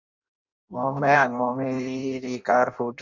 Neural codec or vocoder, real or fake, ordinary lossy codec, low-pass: codec, 16 kHz in and 24 kHz out, 0.6 kbps, FireRedTTS-2 codec; fake; MP3, 64 kbps; 7.2 kHz